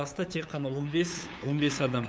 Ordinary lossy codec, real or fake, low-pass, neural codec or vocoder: none; fake; none; codec, 16 kHz, 8 kbps, FunCodec, trained on LibriTTS, 25 frames a second